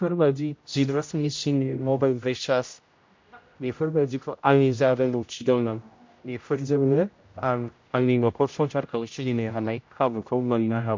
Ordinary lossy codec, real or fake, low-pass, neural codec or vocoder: MP3, 48 kbps; fake; 7.2 kHz; codec, 16 kHz, 0.5 kbps, X-Codec, HuBERT features, trained on general audio